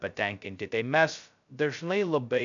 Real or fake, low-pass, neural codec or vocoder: fake; 7.2 kHz; codec, 16 kHz, 0.2 kbps, FocalCodec